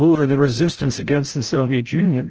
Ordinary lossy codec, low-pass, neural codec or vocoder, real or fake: Opus, 16 kbps; 7.2 kHz; codec, 16 kHz, 0.5 kbps, FreqCodec, larger model; fake